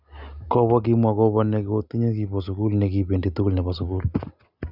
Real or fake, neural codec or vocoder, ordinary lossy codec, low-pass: real; none; Opus, 64 kbps; 5.4 kHz